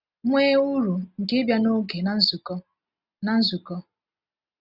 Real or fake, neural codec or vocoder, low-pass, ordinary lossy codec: real; none; 5.4 kHz; none